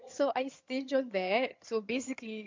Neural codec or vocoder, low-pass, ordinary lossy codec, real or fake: vocoder, 22.05 kHz, 80 mel bands, HiFi-GAN; 7.2 kHz; MP3, 48 kbps; fake